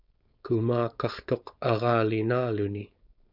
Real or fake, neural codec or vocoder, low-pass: fake; codec, 16 kHz, 4.8 kbps, FACodec; 5.4 kHz